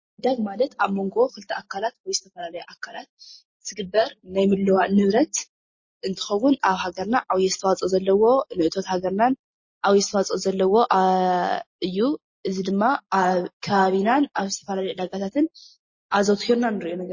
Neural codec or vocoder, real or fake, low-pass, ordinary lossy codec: none; real; 7.2 kHz; MP3, 32 kbps